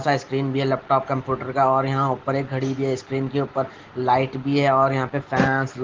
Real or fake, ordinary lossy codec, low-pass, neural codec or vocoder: real; Opus, 16 kbps; 7.2 kHz; none